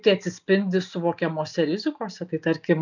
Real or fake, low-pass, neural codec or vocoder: real; 7.2 kHz; none